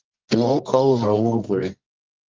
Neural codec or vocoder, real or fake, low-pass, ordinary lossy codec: codec, 24 kHz, 0.9 kbps, WavTokenizer, medium music audio release; fake; 7.2 kHz; Opus, 24 kbps